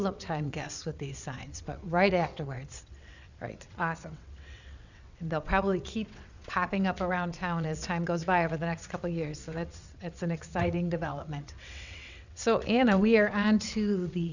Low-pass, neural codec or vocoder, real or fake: 7.2 kHz; vocoder, 22.05 kHz, 80 mel bands, WaveNeXt; fake